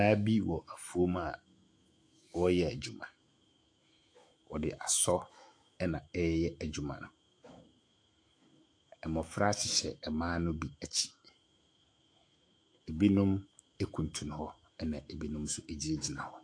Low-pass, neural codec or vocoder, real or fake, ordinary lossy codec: 9.9 kHz; autoencoder, 48 kHz, 128 numbers a frame, DAC-VAE, trained on Japanese speech; fake; AAC, 48 kbps